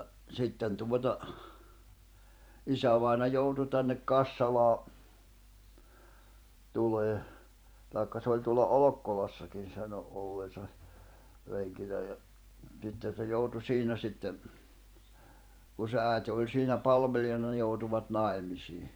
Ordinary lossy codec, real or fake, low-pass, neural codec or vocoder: none; fake; none; vocoder, 44.1 kHz, 128 mel bands every 512 samples, BigVGAN v2